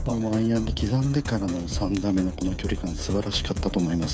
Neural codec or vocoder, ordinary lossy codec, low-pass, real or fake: codec, 16 kHz, 8 kbps, FreqCodec, smaller model; none; none; fake